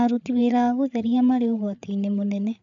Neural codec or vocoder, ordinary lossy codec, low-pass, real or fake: codec, 16 kHz, 8 kbps, FreqCodec, larger model; none; 7.2 kHz; fake